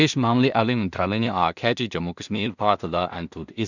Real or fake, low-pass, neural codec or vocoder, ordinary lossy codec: fake; 7.2 kHz; codec, 16 kHz in and 24 kHz out, 0.4 kbps, LongCat-Audio-Codec, two codebook decoder; none